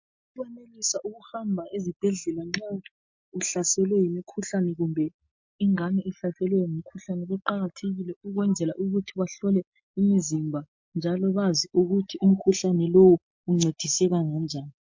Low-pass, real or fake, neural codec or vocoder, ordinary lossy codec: 7.2 kHz; real; none; MP3, 48 kbps